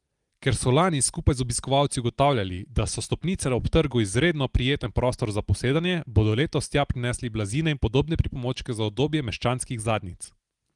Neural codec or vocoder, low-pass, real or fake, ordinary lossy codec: none; 10.8 kHz; real; Opus, 24 kbps